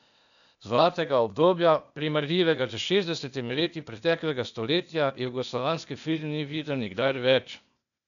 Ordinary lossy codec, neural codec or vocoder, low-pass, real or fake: none; codec, 16 kHz, 0.8 kbps, ZipCodec; 7.2 kHz; fake